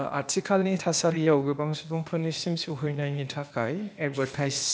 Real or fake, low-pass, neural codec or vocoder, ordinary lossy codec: fake; none; codec, 16 kHz, 0.8 kbps, ZipCodec; none